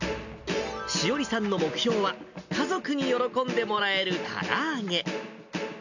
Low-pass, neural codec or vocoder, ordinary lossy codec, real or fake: 7.2 kHz; none; none; real